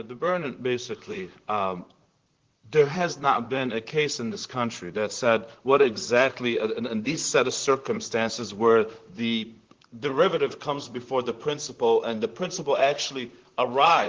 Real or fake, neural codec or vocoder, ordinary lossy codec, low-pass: fake; vocoder, 44.1 kHz, 128 mel bands, Pupu-Vocoder; Opus, 16 kbps; 7.2 kHz